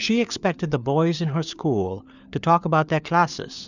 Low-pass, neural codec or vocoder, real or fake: 7.2 kHz; codec, 16 kHz, 4 kbps, FunCodec, trained on LibriTTS, 50 frames a second; fake